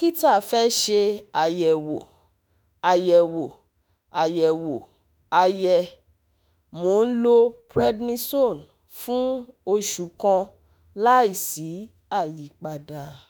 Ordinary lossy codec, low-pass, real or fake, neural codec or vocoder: none; none; fake; autoencoder, 48 kHz, 32 numbers a frame, DAC-VAE, trained on Japanese speech